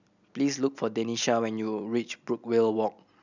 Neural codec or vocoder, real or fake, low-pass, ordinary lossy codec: none; real; 7.2 kHz; none